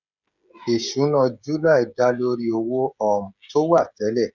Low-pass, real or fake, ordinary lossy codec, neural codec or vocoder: 7.2 kHz; fake; none; codec, 16 kHz, 16 kbps, FreqCodec, smaller model